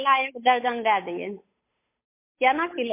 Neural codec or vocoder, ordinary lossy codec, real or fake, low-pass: codec, 16 kHz, 8 kbps, FunCodec, trained on Chinese and English, 25 frames a second; MP3, 24 kbps; fake; 3.6 kHz